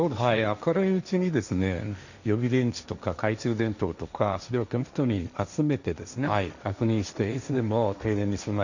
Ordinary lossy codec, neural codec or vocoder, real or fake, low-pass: none; codec, 16 kHz, 1.1 kbps, Voila-Tokenizer; fake; 7.2 kHz